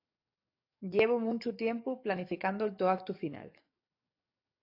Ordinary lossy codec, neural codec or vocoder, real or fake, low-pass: AAC, 32 kbps; codec, 44.1 kHz, 7.8 kbps, DAC; fake; 5.4 kHz